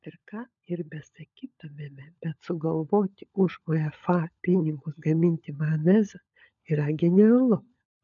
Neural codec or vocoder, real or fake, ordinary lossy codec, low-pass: codec, 16 kHz, 16 kbps, FunCodec, trained on LibriTTS, 50 frames a second; fake; MP3, 96 kbps; 7.2 kHz